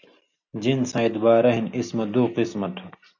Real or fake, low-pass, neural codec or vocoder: real; 7.2 kHz; none